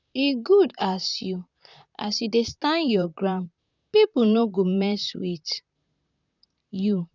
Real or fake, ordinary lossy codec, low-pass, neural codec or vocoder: fake; none; 7.2 kHz; vocoder, 44.1 kHz, 128 mel bands, Pupu-Vocoder